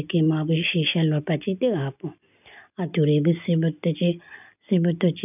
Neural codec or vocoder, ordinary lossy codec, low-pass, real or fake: none; none; 3.6 kHz; real